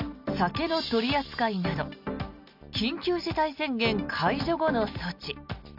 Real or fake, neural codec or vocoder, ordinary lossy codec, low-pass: real; none; none; 5.4 kHz